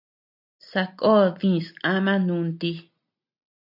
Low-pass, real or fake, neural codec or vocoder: 5.4 kHz; real; none